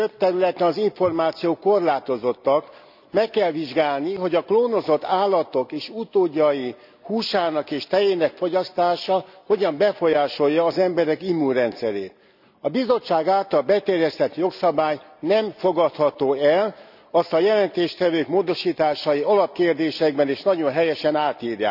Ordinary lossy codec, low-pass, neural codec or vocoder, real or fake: none; 5.4 kHz; none; real